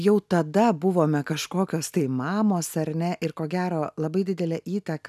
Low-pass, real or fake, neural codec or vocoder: 14.4 kHz; real; none